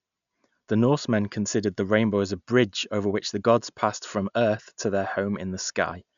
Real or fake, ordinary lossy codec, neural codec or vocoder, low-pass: real; none; none; 7.2 kHz